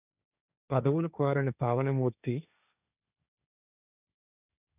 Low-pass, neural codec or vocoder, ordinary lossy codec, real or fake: 3.6 kHz; codec, 16 kHz, 1.1 kbps, Voila-Tokenizer; none; fake